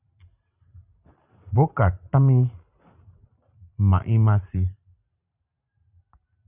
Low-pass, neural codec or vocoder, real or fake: 3.6 kHz; vocoder, 44.1 kHz, 128 mel bands every 512 samples, BigVGAN v2; fake